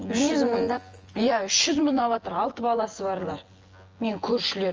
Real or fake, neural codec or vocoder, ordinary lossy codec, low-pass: fake; vocoder, 24 kHz, 100 mel bands, Vocos; Opus, 24 kbps; 7.2 kHz